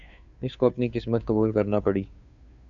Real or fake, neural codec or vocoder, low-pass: fake; codec, 16 kHz, 2 kbps, FunCodec, trained on Chinese and English, 25 frames a second; 7.2 kHz